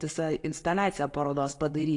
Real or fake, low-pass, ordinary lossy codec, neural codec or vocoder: real; 10.8 kHz; AAC, 48 kbps; none